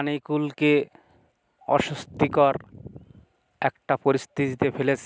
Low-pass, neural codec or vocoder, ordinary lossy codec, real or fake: none; none; none; real